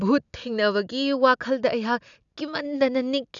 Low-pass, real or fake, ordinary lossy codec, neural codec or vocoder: 7.2 kHz; real; none; none